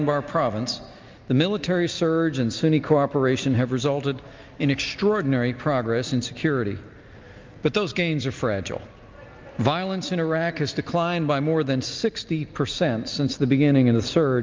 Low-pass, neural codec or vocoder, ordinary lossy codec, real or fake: 7.2 kHz; none; Opus, 32 kbps; real